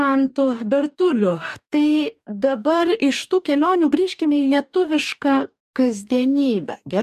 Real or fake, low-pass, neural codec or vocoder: fake; 14.4 kHz; codec, 44.1 kHz, 2.6 kbps, DAC